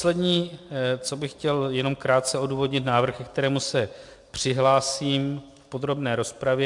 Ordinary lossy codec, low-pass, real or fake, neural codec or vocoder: MP3, 64 kbps; 10.8 kHz; real; none